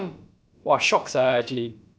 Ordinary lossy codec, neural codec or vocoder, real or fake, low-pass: none; codec, 16 kHz, about 1 kbps, DyCAST, with the encoder's durations; fake; none